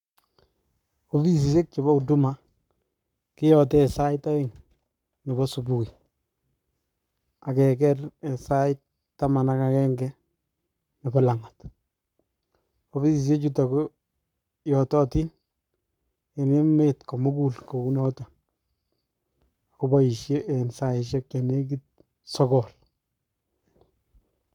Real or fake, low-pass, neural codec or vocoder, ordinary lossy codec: fake; 19.8 kHz; codec, 44.1 kHz, 7.8 kbps, Pupu-Codec; none